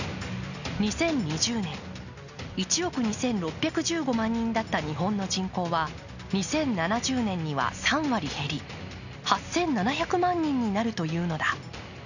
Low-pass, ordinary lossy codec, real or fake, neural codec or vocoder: 7.2 kHz; none; real; none